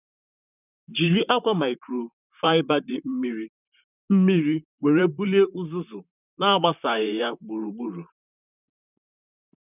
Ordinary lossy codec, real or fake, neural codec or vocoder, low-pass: none; fake; vocoder, 44.1 kHz, 128 mel bands, Pupu-Vocoder; 3.6 kHz